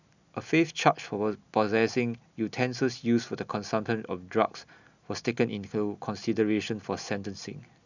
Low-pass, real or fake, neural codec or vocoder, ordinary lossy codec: 7.2 kHz; real; none; none